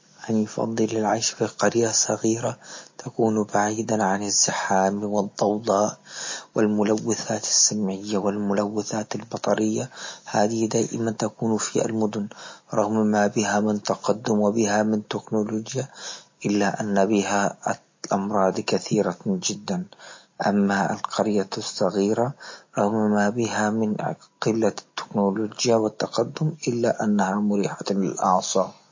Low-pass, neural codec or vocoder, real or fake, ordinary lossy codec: 7.2 kHz; none; real; MP3, 32 kbps